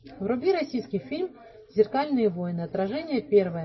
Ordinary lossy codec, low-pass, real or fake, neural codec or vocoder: MP3, 24 kbps; 7.2 kHz; real; none